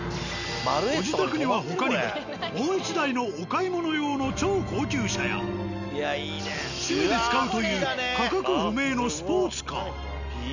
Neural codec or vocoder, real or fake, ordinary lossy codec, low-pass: none; real; none; 7.2 kHz